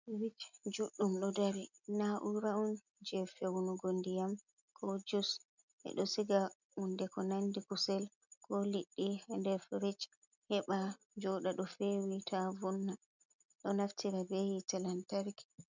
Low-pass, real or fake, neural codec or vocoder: 7.2 kHz; real; none